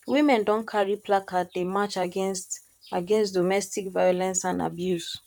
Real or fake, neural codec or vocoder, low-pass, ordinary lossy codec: fake; vocoder, 44.1 kHz, 128 mel bands, Pupu-Vocoder; 19.8 kHz; none